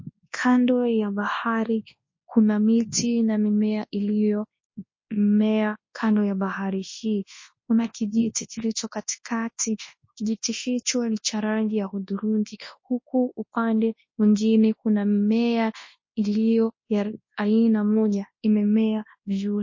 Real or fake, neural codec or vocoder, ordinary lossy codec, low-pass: fake; codec, 24 kHz, 0.9 kbps, WavTokenizer, large speech release; MP3, 32 kbps; 7.2 kHz